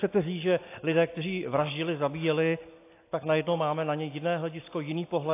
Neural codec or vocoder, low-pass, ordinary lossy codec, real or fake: none; 3.6 kHz; AAC, 24 kbps; real